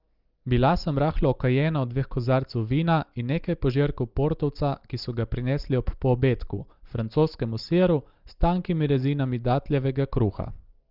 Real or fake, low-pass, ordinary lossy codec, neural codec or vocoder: real; 5.4 kHz; Opus, 32 kbps; none